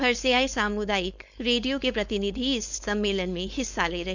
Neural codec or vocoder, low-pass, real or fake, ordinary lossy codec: codec, 16 kHz, 4.8 kbps, FACodec; 7.2 kHz; fake; none